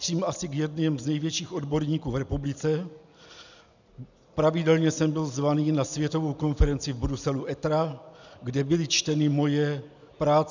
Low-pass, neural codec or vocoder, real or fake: 7.2 kHz; none; real